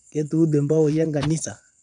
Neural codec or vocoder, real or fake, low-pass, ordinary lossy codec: vocoder, 22.05 kHz, 80 mel bands, Vocos; fake; 9.9 kHz; none